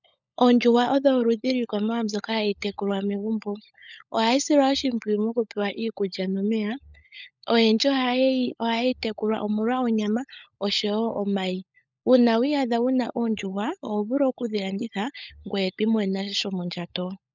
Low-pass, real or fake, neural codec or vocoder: 7.2 kHz; fake; codec, 16 kHz, 16 kbps, FunCodec, trained on LibriTTS, 50 frames a second